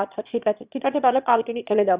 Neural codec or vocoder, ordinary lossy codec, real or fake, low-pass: autoencoder, 22.05 kHz, a latent of 192 numbers a frame, VITS, trained on one speaker; Opus, 64 kbps; fake; 3.6 kHz